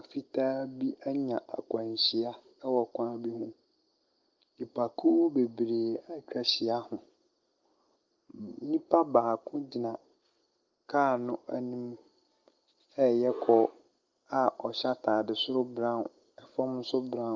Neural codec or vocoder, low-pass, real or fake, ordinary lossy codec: none; 7.2 kHz; real; Opus, 24 kbps